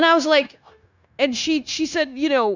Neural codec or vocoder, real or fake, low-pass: codec, 16 kHz, 0.9 kbps, LongCat-Audio-Codec; fake; 7.2 kHz